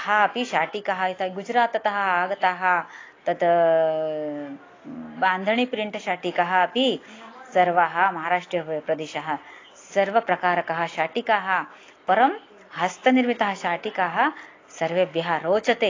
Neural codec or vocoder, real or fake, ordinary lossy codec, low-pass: none; real; AAC, 32 kbps; 7.2 kHz